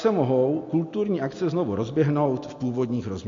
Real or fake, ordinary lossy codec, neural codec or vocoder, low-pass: real; MP3, 48 kbps; none; 7.2 kHz